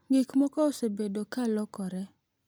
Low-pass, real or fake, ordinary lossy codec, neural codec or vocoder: none; real; none; none